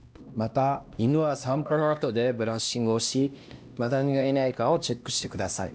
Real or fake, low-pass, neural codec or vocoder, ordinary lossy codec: fake; none; codec, 16 kHz, 1 kbps, X-Codec, HuBERT features, trained on LibriSpeech; none